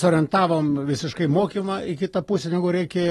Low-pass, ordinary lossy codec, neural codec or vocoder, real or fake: 19.8 kHz; AAC, 32 kbps; none; real